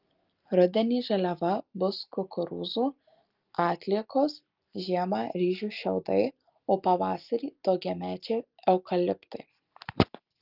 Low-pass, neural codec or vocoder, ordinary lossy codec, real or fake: 5.4 kHz; none; Opus, 24 kbps; real